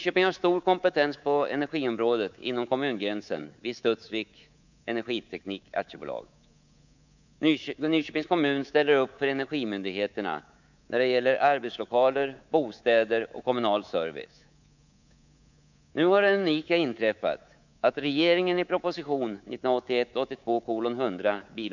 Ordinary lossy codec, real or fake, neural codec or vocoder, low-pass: none; fake; codec, 24 kHz, 3.1 kbps, DualCodec; 7.2 kHz